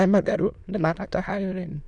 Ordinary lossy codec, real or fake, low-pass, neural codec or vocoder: none; fake; 9.9 kHz; autoencoder, 22.05 kHz, a latent of 192 numbers a frame, VITS, trained on many speakers